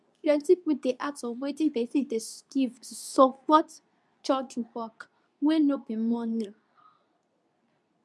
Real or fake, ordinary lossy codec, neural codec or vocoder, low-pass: fake; none; codec, 24 kHz, 0.9 kbps, WavTokenizer, medium speech release version 2; none